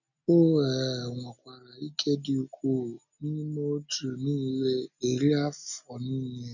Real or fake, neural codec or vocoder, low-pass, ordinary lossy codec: real; none; 7.2 kHz; none